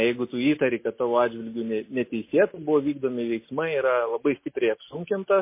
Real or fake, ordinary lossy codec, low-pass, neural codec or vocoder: real; MP3, 24 kbps; 3.6 kHz; none